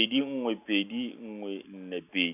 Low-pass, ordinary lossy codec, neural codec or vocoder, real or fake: 3.6 kHz; none; none; real